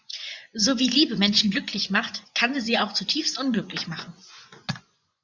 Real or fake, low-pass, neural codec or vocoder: fake; 7.2 kHz; vocoder, 24 kHz, 100 mel bands, Vocos